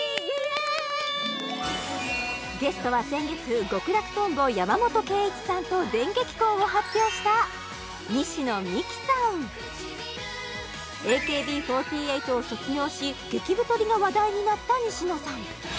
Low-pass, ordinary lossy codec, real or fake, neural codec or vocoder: none; none; real; none